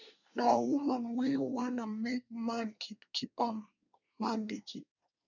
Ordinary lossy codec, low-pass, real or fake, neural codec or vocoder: none; 7.2 kHz; fake; codec, 24 kHz, 1 kbps, SNAC